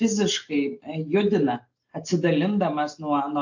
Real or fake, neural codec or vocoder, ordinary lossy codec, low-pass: real; none; AAC, 48 kbps; 7.2 kHz